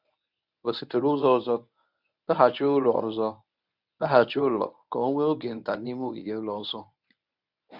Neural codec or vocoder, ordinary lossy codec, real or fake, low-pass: codec, 24 kHz, 0.9 kbps, WavTokenizer, medium speech release version 1; none; fake; 5.4 kHz